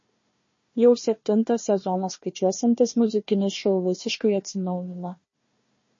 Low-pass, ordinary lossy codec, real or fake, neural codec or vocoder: 7.2 kHz; MP3, 32 kbps; fake; codec, 16 kHz, 1 kbps, FunCodec, trained on Chinese and English, 50 frames a second